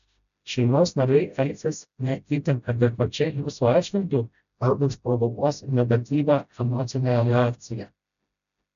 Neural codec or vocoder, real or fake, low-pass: codec, 16 kHz, 0.5 kbps, FreqCodec, smaller model; fake; 7.2 kHz